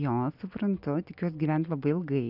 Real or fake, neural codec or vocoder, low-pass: real; none; 5.4 kHz